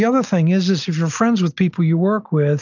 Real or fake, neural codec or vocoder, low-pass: real; none; 7.2 kHz